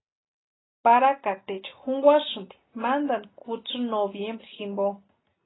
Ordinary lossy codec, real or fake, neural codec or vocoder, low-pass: AAC, 16 kbps; real; none; 7.2 kHz